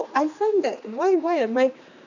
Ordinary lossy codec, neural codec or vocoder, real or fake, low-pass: none; codec, 16 kHz, 2 kbps, X-Codec, HuBERT features, trained on general audio; fake; 7.2 kHz